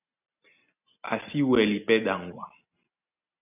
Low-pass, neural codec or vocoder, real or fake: 3.6 kHz; none; real